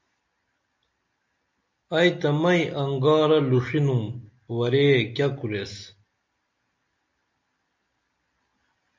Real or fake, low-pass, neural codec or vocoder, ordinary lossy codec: real; 7.2 kHz; none; MP3, 48 kbps